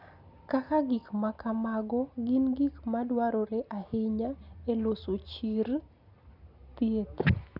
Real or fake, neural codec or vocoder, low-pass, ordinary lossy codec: real; none; 5.4 kHz; none